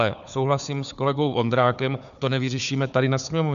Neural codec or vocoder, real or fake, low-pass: codec, 16 kHz, 4 kbps, FunCodec, trained on Chinese and English, 50 frames a second; fake; 7.2 kHz